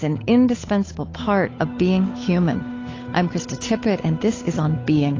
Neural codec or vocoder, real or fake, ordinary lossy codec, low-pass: codec, 16 kHz, 8 kbps, FunCodec, trained on Chinese and English, 25 frames a second; fake; AAC, 32 kbps; 7.2 kHz